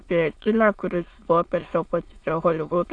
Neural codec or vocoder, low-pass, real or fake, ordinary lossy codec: autoencoder, 22.05 kHz, a latent of 192 numbers a frame, VITS, trained on many speakers; 9.9 kHz; fake; AAC, 48 kbps